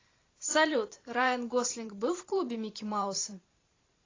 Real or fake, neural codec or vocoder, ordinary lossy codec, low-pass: fake; vocoder, 44.1 kHz, 128 mel bands every 512 samples, BigVGAN v2; AAC, 32 kbps; 7.2 kHz